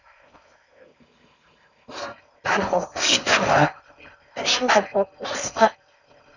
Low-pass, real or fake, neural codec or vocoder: 7.2 kHz; fake; codec, 16 kHz in and 24 kHz out, 0.6 kbps, FocalCodec, streaming, 4096 codes